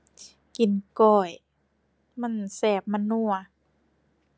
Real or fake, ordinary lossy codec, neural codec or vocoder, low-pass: real; none; none; none